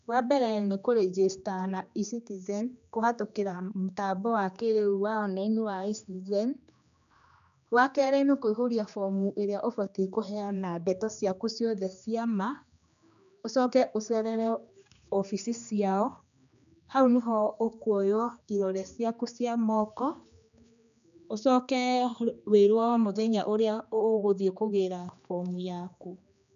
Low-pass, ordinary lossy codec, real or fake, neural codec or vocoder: 7.2 kHz; none; fake; codec, 16 kHz, 2 kbps, X-Codec, HuBERT features, trained on general audio